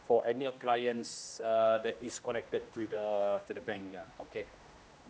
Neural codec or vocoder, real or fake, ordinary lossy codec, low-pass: codec, 16 kHz, 1 kbps, X-Codec, HuBERT features, trained on general audio; fake; none; none